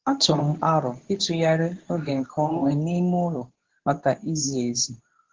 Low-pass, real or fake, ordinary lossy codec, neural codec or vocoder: 7.2 kHz; fake; Opus, 16 kbps; codec, 24 kHz, 0.9 kbps, WavTokenizer, medium speech release version 2